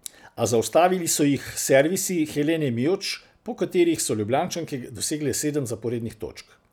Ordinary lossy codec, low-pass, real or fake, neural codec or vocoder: none; none; real; none